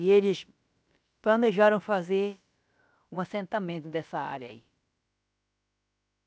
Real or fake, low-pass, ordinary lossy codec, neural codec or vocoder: fake; none; none; codec, 16 kHz, about 1 kbps, DyCAST, with the encoder's durations